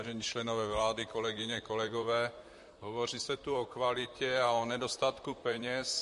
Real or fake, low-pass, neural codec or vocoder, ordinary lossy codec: fake; 14.4 kHz; vocoder, 44.1 kHz, 128 mel bands, Pupu-Vocoder; MP3, 48 kbps